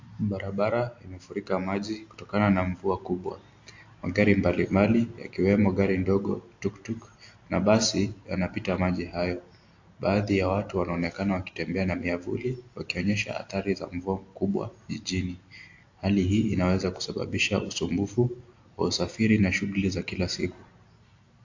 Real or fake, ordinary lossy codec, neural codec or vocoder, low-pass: real; AAC, 48 kbps; none; 7.2 kHz